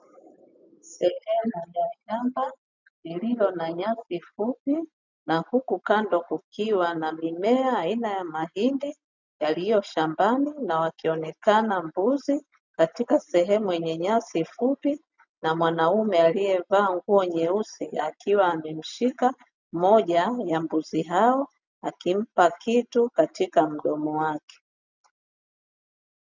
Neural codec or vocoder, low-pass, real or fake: none; 7.2 kHz; real